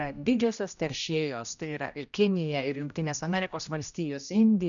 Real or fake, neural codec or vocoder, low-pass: fake; codec, 16 kHz, 1 kbps, X-Codec, HuBERT features, trained on general audio; 7.2 kHz